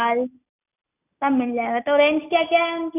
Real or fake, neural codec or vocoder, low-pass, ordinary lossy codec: real; none; 3.6 kHz; none